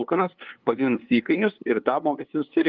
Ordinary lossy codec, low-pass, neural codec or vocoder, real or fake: Opus, 32 kbps; 7.2 kHz; codec, 16 kHz, 2 kbps, FunCodec, trained on LibriTTS, 25 frames a second; fake